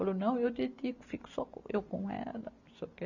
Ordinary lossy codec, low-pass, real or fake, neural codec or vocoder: none; 7.2 kHz; real; none